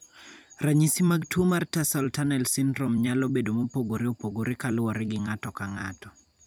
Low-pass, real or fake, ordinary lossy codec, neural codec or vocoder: none; fake; none; vocoder, 44.1 kHz, 128 mel bands every 256 samples, BigVGAN v2